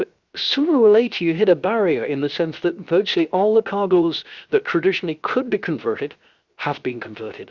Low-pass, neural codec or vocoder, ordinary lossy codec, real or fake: 7.2 kHz; codec, 16 kHz, 0.7 kbps, FocalCodec; Opus, 64 kbps; fake